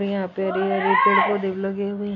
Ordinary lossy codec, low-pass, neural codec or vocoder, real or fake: none; 7.2 kHz; none; real